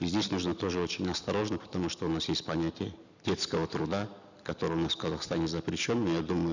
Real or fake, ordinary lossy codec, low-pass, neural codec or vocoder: real; none; 7.2 kHz; none